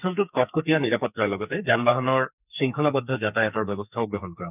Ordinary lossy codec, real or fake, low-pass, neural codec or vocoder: none; fake; 3.6 kHz; codec, 44.1 kHz, 2.6 kbps, SNAC